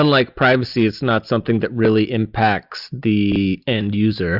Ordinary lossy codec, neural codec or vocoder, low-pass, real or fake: Opus, 64 kbps; none; 5.4 kHz; real